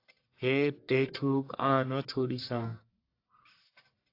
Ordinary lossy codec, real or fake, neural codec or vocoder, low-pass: AAC, 32 kbps; fake; codec, 44.1 kHz, 1.7 kbps, Pupu-Codec; 5.4 kHz